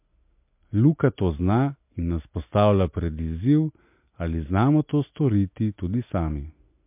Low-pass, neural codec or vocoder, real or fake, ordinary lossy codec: 3.6 kHz; none; real; MP3, 32 kbps